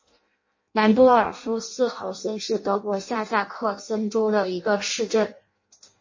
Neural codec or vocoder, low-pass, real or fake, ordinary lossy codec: codec, 16 kHz in and 24 kHz out, 0.6 kbps, FireRedTTS-2 codec; 7.2 kHz; fake; MP3, 32 kbps